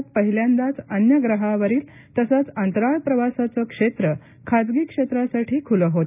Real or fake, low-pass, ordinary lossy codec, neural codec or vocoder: real; 3.6 kHz; none; none